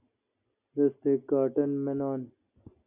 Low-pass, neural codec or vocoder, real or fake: 3.6 kHz; none; real